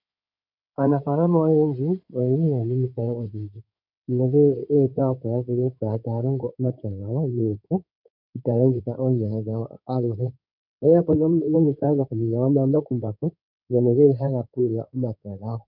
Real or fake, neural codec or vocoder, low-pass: fake; codec, 16 kHz in and 24 kHz out, 2.2 kbps, FireRedTTS-2 codec; 5.4 kHz